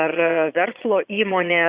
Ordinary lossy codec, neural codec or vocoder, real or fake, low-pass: Opus, 64 kbps; vocoder, 22.05 kHz, 80 mel bands, Vocos; fake; 3.6 kHz